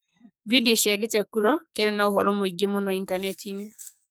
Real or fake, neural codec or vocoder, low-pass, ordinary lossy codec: fake; codec, 44.1 kHz, 2.6 kbps, SNAC; none; none